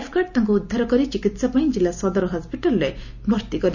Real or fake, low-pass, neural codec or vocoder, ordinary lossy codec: real; 7.2 kHz; none; none